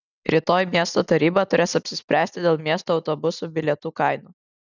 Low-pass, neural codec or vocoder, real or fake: 7.2 kHz; none; real